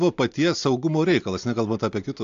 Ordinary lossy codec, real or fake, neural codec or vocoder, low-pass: AAC, 64 kbps; real; none; 7.2 kHz